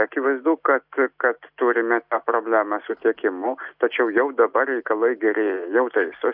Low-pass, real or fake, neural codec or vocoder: 5.4 kHz; real; none